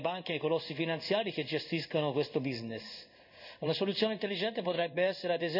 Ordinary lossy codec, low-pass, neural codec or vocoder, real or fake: none; 5.4 kHz; codec, 16 kHz in and 24 kHz out, 1 kbps, XY-Tokenizer; fake